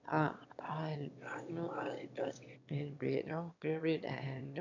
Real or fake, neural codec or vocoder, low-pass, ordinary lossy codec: fake; autoencoder, 22.05 kHz, a latent of 192 numbers a frame, VITS, trained on one speaker; 7.2 kHz; none